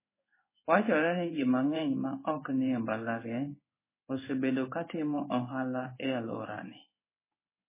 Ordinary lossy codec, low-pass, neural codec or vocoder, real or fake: MP3, 16 kbps; 3.6 kHz; codec, 16 kHz in and 24 kHz out, 1 kbps, XY-Tokenizer; fake